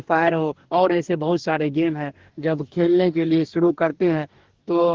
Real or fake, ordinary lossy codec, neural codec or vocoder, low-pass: fake; Opus, 16 kbps; codec, 44.1 kHz, 2.6 kbps, DAC; 7.2 kHz